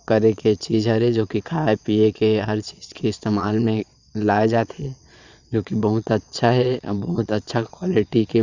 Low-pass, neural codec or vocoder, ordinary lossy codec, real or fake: 7.2 kHz; vocoder, 22.05 kHz, 80 mel bands, Vocos; Opus, 64 kbps; fake